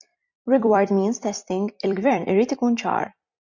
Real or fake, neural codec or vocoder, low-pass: fake; vocoder, 44.1 kHz, 128 mel bands every 256 samples, BigVGAN v2; 7.2 kHz